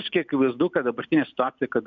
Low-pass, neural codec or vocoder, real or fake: 7.2 kHz; none; real